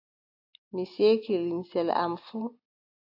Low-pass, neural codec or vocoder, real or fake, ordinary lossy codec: 5.4 kHz; none; real; AAC, 32 kbps